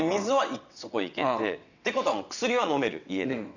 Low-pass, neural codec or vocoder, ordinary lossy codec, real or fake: 7.2 kHz; vocoder, 22.05 kHz, 80 mel bands, WaveNeXt; none; fake